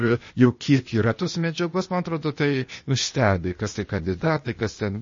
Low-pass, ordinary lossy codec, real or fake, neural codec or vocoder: 7.2 kHz; MP3, 32 kbps; fake; codec, 16 kHz, 0.8 kbps, ZipCodec